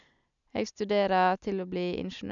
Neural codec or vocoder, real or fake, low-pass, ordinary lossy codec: none; real; 7.2 kHz; none